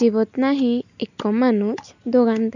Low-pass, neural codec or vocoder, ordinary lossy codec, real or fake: 7.2 kHz; none; none; real